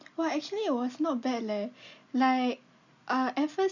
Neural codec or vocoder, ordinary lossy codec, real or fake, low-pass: none; none; real; 7.2 kHz